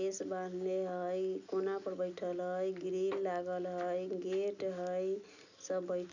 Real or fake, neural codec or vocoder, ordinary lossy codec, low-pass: real; none; none; 7.2 kHz